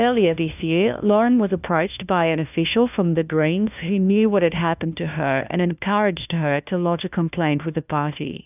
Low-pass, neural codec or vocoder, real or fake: 3.6 kHz; codec, 16 kHz, 1 kbps, FunCodec, trained on LibriTTS, 50 frames a second; fake